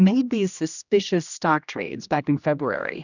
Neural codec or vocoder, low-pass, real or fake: codec, 16 kHz, 1 kbps, X-Codec, HuBERT features, trained on general audio; 7.2 kHz; fake